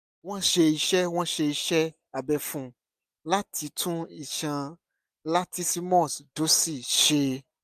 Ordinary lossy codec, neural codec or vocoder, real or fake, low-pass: MP3, 96 kbps; none; real; 14.4 kHz